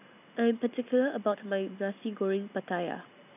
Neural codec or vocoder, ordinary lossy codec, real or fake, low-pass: none; none; real; 3.6 kHz